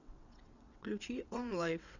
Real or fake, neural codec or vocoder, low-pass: fake; vocoder, 22.05 kHz, 80 mel bands, WaveNeXt; 7.2 kHz